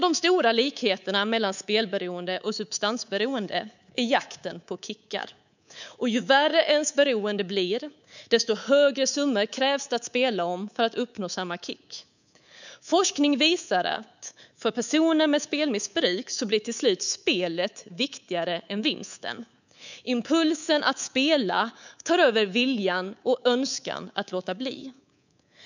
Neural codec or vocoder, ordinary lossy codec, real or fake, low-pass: codec, 24 kHz, 3.1 kbps, DualCodec; none; fake; 7.2 kHz